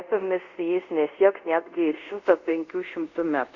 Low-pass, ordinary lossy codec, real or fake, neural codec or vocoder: 7.2 kHz; Opus, 64 kbps; fake; codec, 24 kHz, 0.5 kbps, DualCodec